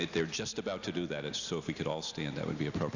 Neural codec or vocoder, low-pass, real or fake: none; 7.2 kHz; real